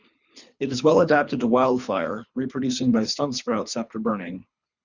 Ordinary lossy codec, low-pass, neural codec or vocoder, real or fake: Opus, 64 kbps; 7.2 kHz; codec, 24 kHz, 3 kbps, HILCodec; fake